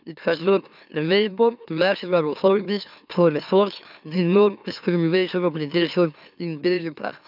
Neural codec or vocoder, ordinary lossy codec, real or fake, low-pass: autoencoder, 44.1 kHz, a latent of 192 numbers a frame, MeloTTS; none; fake; 5.4 kHz